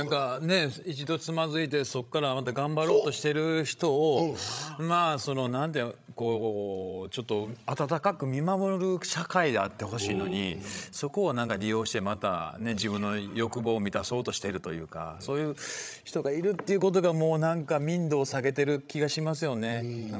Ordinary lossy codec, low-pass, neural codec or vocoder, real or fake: none; none; codec, 16 kHz, 16 kbps, FreqCodec, larger model; fake